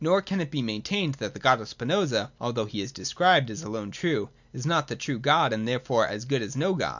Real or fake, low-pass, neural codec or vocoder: real; 7.2 kHz; none